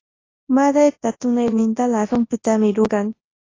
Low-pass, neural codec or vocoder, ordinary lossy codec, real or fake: 7.2 kHz; codec, 24 kHz, 0.9 kbps, WavTokenizer, large speech release; AAC, 32 kbps; fake